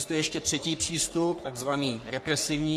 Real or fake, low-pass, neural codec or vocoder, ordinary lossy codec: fake; 14.4 kHz; codec, 44.1 kHz, 3.4 kbps, Pupu-Codec; AAC, 64 kbps